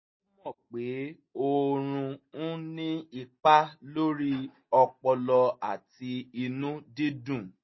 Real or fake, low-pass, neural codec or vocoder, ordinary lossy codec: real; 7.2 kHz; none; MP3, 24 kbps